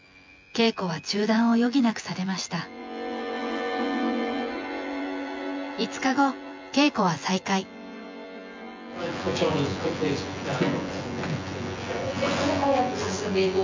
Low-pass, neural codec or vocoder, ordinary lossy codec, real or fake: 7.2 kHz; vocoder, 24 kHz, 100 mel bands, Vocos; MP3, 64 kbps; fake